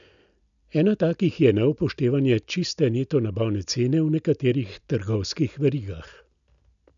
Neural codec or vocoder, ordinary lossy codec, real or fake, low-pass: none; none; real; 7.2 kHz